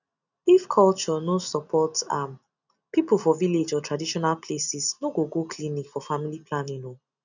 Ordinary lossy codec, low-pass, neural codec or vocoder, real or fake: none; 7.2 kHz; none; real